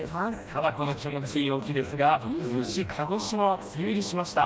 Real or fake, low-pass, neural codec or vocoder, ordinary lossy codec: fake; none; codec, 16 kHz, 1 kbps, FreqCodec, smaller model; none